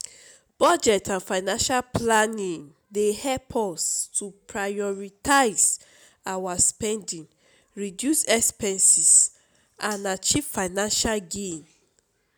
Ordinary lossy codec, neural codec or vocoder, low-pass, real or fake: none; none; none; real